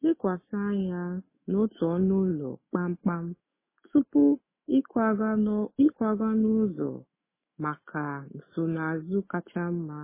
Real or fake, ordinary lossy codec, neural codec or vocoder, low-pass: fake; MP3, 16 kbps; autoencoder, 48 kHz, 128 numbers a frame, DAC-VAE, trained on Japanese speech; 3.6 kHz